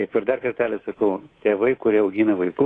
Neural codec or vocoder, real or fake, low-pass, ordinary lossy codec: codec, 24 kHz, 3.1 kbps, DualCodec; fake; 9.9 kHz; AAC, 32 kbps